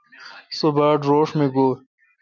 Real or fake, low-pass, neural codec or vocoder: real; 7.2 kHz; none